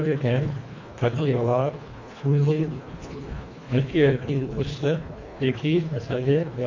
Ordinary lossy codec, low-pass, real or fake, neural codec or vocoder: AAC, 48 kbps; 7.2 kHz; fake; codec, 24 kHz, 1.5 kbps, HILCodec